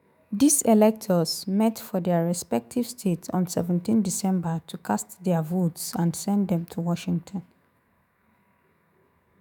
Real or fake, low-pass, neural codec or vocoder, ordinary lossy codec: fake; none; autoencoder, 48 kHz, 128 numbers a frame, DAC-VAE, trained on Japanese speech; none